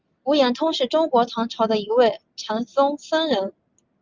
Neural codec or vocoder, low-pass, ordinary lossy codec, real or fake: none; 7.2 kHz; Opus, 32 kbps; real